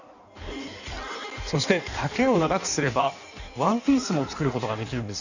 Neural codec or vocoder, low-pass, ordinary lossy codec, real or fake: codec, 16 kHz in and 24 kHz out, 1.1 kbps, FireRedTTS-2 codec; 7.2 kHz; none; fake